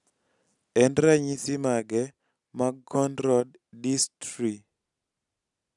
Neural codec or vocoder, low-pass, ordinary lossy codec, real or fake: none; 10.8 kHz; none; real